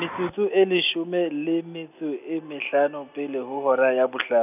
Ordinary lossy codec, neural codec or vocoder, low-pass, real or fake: none; none; 3.6 kHz; real